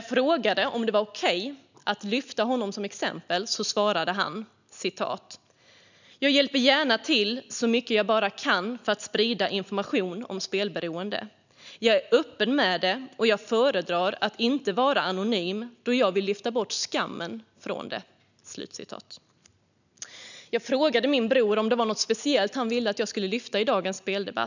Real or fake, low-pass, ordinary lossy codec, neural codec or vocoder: real; 7.2 kHz; none; none